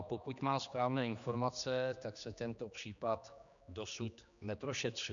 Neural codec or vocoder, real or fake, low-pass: codec, 16 kHz, 2 kbps, X-Codec, HuBERT features, trained on general audio; fake; 7.2 kHz